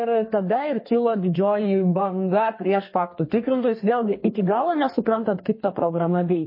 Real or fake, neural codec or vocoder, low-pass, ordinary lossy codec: fake; codec, 32 kHz, 1.9 kbps, SNAC; 5.4 kHz; MP3, 24 kbps